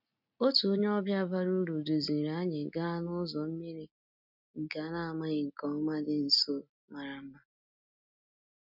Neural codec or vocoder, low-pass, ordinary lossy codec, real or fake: none; 5.4 kHz; none; real